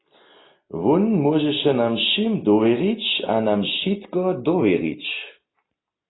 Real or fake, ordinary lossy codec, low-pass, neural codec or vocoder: real; AAC, 16 kbps; 7.2 kHz; none